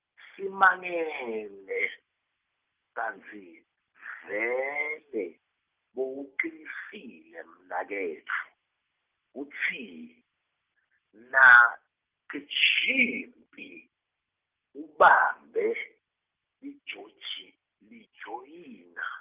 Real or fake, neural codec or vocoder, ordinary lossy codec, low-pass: real; none; Opus, 32 kbps; 3.6 kHz